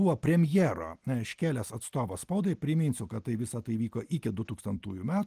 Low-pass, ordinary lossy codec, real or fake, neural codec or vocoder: 14.4 kHz; Opus, 24 kbps; real; none